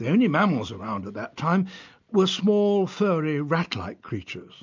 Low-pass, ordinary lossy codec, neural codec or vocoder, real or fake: 7.2 kHz; MP3, 64 kbps; none; real